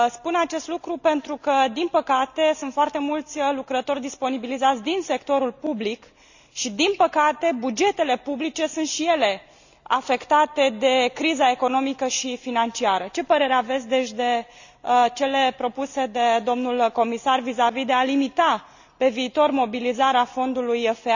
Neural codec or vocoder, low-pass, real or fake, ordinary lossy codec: none; 7.2 kHz; real; none